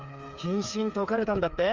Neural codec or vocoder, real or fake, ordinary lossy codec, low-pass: codec, 16 kHz, 8 kbps, FreqCodec, smaller model; fake; Opus, 32 kbps; 7.2 kHz